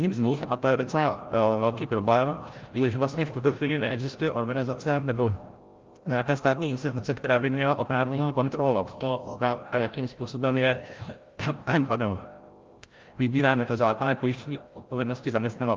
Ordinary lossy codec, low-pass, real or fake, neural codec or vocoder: Opus, 32 kbps; 7.2 kHz; fake; codec, 16 kHz, 0.5 kbps, FreqCodec, larger model